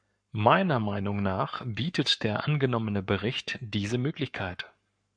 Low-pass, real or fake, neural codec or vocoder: 9.9 kHz; fake; codec, 44.1 kHz, 7.8 kbps, Pupu-Codec